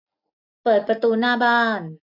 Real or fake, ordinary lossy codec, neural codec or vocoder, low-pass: real; none; none; 5.4 kHz